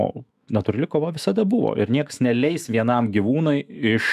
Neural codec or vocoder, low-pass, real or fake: autoencoder, 48 kHz, 128 numbers a frame, DAC-VAE, trained on Japanese speech; 14.4 kHz; fake